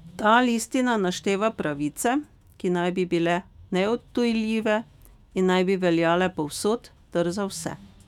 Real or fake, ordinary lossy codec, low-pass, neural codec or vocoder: fake; none; 19.8 kHz; autoencoder, 48 kHz, 128 numbers a frame, DAC-VAE, trained on Japanese speech